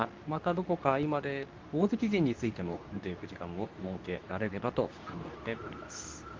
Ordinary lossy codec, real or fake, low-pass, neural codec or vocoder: Opus, 32 kbps; fake; 7.2 kHz; codec, 24 kHz, 0.9 kbps, WavTokenizer, medium speech release version 2